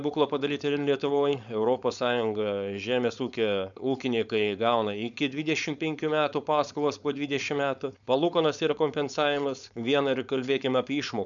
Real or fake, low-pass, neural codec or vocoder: fake; 7.2 kHz; codec, 16 kHz, 4.8 kbps, FACodec